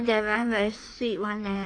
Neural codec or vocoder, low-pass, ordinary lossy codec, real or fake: codec, 16 kHz in and 24 kHz out, 1.1 kbps, FireRedTTS-2 codec; 9.9 kHz; AAC, 48 kbps; fake